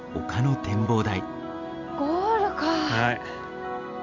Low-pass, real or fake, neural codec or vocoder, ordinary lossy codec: 7.2 kHz; real; none; MP3, 64 kbps